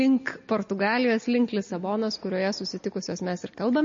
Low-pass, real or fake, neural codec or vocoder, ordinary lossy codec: 7.2 kHz; real; none; MP3, 32 kbps